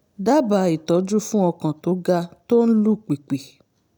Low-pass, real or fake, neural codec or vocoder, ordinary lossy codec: none; real; none; none